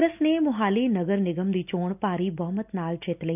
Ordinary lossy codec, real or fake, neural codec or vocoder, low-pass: none; real; none; 3.6 kHz